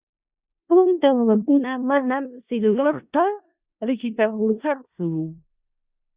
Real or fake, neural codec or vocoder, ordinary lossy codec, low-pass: fake; codec, 16 kHz in and 24 kHz out, 0.4 kbps, LongCat-Audio-Codec, four codebook decoder; Opus, 64 kbps; 3.6 kHz